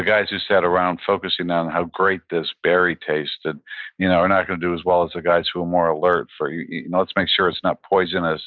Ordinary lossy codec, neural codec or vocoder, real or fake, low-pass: Opus, 64 kbps; none; real; 7.2 kHz